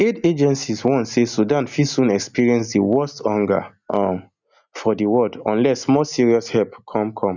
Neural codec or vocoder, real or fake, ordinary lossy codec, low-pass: none; real; none; 7.2 kHz